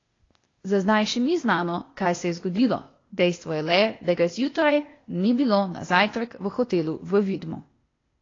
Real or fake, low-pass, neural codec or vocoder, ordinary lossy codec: fake; 7.2 kHz; codec, 16 kHz, 0.8 kbps, ZipCodec; AAC, 32 kbps